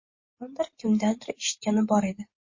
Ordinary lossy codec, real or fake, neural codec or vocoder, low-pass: MP3, 32 kbps; real; none; 7.2 kHz